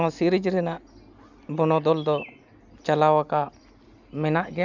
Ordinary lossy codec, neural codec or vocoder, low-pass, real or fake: none; none; 7.2 kHz; real